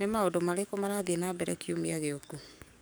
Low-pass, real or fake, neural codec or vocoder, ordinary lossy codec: none; fake; codec, 44.1 kHz, 7.8 kbps, DAC; none